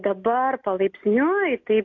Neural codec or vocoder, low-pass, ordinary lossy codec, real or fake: none; 7.2 kHz; AAC, 48 kbps; real